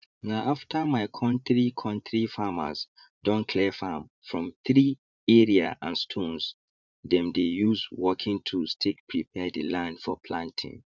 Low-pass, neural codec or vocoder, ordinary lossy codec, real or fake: 7.2 kHz; vocoder, 44.1 kHz, 80 mel bands, Vocos; none; fake